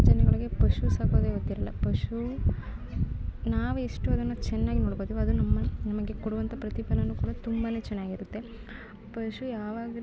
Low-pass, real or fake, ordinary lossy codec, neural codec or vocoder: none; real; none; none